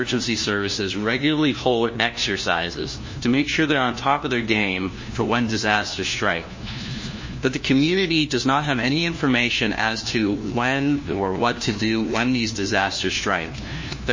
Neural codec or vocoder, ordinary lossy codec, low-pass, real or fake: codec, 16 kHz, 1 kbps, FunCodec, trained on LibriTTS, 50 frames a second; MP3, 32 kbps; 7.2 kHz; fake